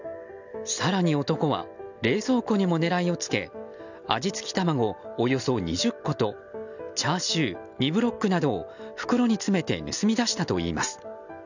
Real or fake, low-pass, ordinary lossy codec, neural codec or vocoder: real; 7.2 kHz; none; none